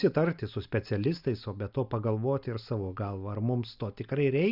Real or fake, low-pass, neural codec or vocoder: real; 5.4 kHz; none